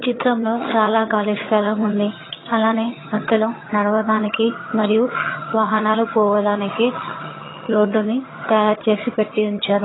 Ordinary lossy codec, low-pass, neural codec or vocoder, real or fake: AAC, 16 kbps; 7.2 kHz; vocoder, 22.05 kHz, 80 mel bands, HiFi-GAN; fake